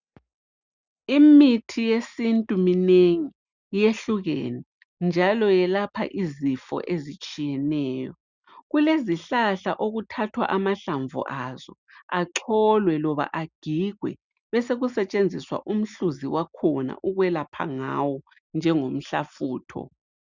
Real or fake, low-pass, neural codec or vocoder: real; 7.2 kHz; none